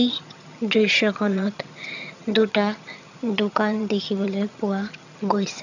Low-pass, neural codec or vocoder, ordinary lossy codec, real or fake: 7.2 kHz; vocoder, 22.05 kHz, 80 mel bands, HiFi-GAN; none; fake